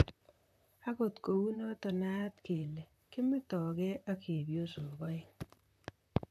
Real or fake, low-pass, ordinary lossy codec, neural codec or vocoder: fake; 14.4 kHz; none; vocoder, 44.1 kHz, 128 mel bands, Pupu-Vocoder